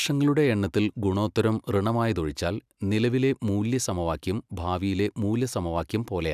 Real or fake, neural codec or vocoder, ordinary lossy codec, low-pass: real; none; none; 14.4 kHz